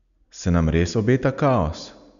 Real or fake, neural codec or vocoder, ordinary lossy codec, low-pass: real; none; none; 7.2 kHz